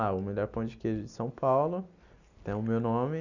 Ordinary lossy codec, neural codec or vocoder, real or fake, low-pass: none; none; real; 7.2 kHz